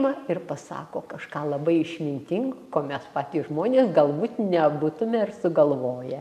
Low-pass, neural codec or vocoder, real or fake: 14.4 kHz; none; real